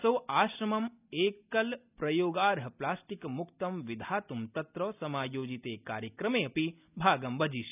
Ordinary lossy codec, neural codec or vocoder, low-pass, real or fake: none; none; 3.6 kHz; real